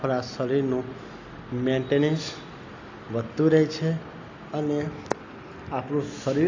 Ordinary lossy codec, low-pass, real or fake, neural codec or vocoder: AAC, 48 kbps; 7.2 kHz; real; none